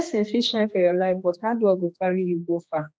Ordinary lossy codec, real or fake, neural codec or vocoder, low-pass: none; fake; codec, 16 kHz, 2 kbps, X-Codec, HuBERT features, trained on general audio; none